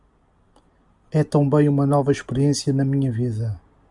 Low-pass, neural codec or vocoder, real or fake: 10.8 kHz; none; real